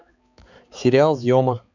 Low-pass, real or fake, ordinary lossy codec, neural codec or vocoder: 7.2 kHz; fake; none; codec, 16 kHz, 4 kbps, X-Codec, HuBERT features, trained on balanced general audio